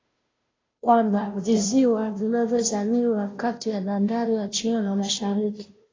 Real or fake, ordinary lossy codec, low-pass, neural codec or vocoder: fake; AAC, 32 kbps; 7.2 kHz; codec, 16 kHz, 0.5 kbps, FunCodec, trained on Chinese and English, 25 frames a second